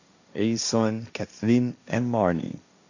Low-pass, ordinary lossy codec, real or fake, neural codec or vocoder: 7.2 kHz; none; fake; codec, 16 kHz, 1.1 kbps, Voila-Tokenizer